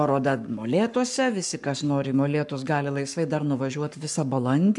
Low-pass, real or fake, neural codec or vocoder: 10.8 kHz; fake; codec, 44.1 kHz, 7.8 kbps, Pupu-Codec